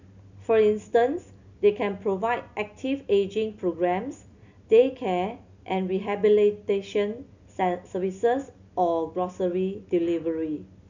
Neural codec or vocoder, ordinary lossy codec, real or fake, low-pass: none; none; real; 7.2 kHz